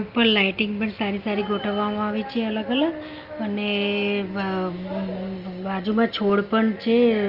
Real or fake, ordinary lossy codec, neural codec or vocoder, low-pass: real; Opus, 24 kbps; none; 5.4 kHz